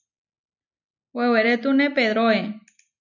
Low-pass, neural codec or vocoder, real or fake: 7.2 kHz; none; real